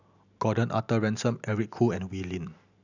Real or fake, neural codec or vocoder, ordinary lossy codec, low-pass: real; none; none; 7.2 kHz